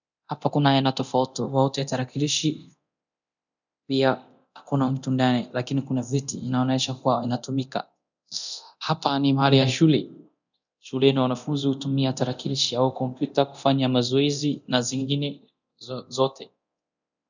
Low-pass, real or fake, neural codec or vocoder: 7.2 kHz; fake; codec, 24 kHz, 0.9 kbps, DualCodec